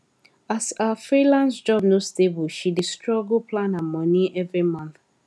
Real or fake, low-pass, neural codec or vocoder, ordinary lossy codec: real; none; none; none